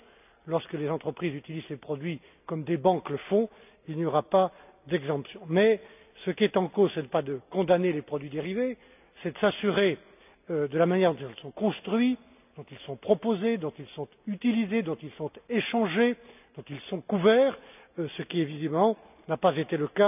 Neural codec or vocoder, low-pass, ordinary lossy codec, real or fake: none; 3.6 kHz; none; real